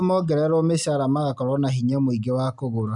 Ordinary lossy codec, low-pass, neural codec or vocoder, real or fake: none; 10.8 kHz; none; real